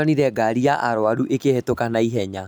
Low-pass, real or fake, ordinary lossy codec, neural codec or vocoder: none; real; none; none